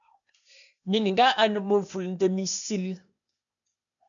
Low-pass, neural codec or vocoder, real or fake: 7.2 kHz; codec, 16 kHz, 0.8 kbps, ZipCodec; fake